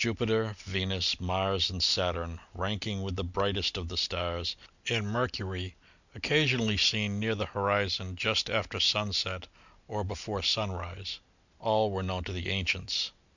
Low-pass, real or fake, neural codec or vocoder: 7.2 kHz; real; none